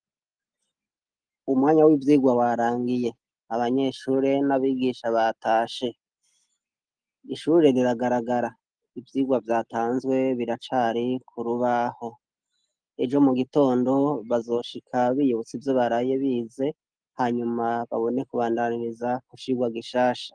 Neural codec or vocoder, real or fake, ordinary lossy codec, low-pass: none; real; Opus, 24 kbps; 9.9 kHz